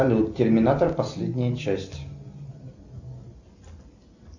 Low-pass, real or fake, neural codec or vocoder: 7.2 kHz; real; none